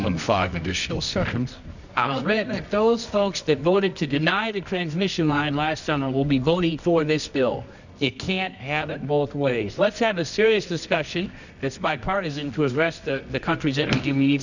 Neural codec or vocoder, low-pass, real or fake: codec, 24 kHz, 0.9 kbps, WavTokenizer, medium music audio release; 7.2 kHz; fake